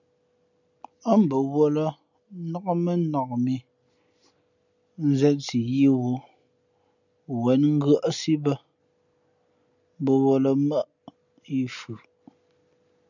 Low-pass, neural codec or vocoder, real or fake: 7.2 kHz; none; real